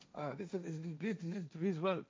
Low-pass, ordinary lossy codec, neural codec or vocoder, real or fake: none; none; codec, 16 kHz, 1.1 kbps, Voila-Tokenizer; fake